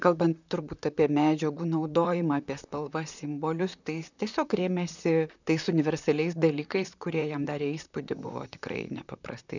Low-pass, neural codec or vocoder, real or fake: 7.2 kHz; vocoder, 44.1 kHz, 128 mel bands, Pupu-Vocoder; fake